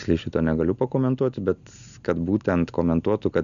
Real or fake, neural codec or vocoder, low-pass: real; none; 7.2 kHz